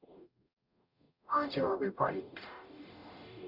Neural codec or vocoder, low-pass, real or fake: codec, 44.1 kHz, 0.9 kbps, DAC; 5.4 kHz; fake